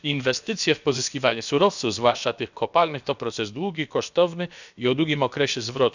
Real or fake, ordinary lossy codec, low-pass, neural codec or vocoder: fake; none; 7.2 kHz; codec, 16 kHz, about 1 kbps, DyCAST, with the encoder's durations